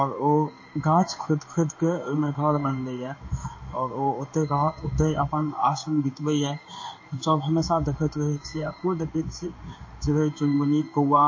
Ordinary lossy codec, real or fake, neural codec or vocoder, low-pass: MP3, 32 kbps; fake; codec, 16 kHz in and 24 kHz out, 1 kbps, XY-Tokenizer; 7.2 kHz